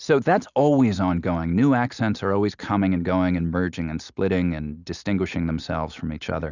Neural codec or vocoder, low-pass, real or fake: none; 7.2 kHz; real